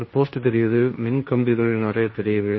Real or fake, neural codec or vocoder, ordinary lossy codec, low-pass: fake; codec, 16 kHz, 1.1 kbps, Voila-Tokenizer; MP3, 24 kbps; 7.2 kHz